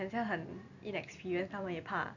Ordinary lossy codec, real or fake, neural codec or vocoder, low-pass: none; real; none; 7.2 kHz